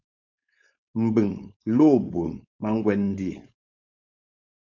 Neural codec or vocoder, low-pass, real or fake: codec, 16 kHz, 4.8 kbps, FACodec; 7.2 kHz; fake